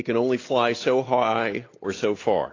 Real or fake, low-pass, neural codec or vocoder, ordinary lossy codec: real; 7.2 kHz; none; AAC, 32 kbps